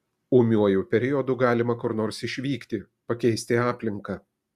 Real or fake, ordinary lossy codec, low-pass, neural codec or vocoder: real; Opus, 64 kbps; 14.4 kHz; none